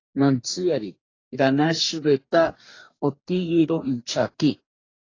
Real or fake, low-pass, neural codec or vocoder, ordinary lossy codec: fake; 7.2 kHz; codec, 44.1 kHz, 2.6 kbps, DAC; AAC, 32 kbps